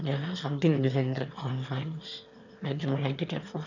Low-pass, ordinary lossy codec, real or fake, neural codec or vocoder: 7.2 kHz; none; fake; autoencoder, 22.05 kHz, a latent of 192 numbers a frame, VITS, trained on one speaker